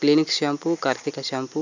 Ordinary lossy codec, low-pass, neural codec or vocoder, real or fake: none; 7.2 kHz; none; real